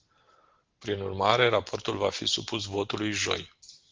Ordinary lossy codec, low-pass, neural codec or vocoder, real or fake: Opus, 16 kbps; 7.2 kHz; none; real